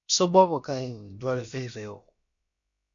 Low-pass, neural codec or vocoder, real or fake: 7.2 kHz; codec, 16 kHz, about 1 kbps, DyCAST, with the encoder's durations; fake